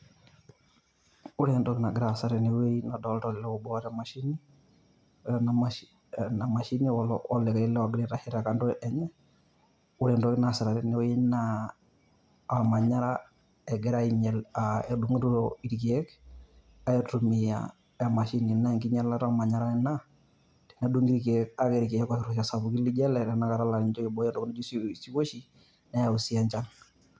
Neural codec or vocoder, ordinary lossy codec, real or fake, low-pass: none; none; real; none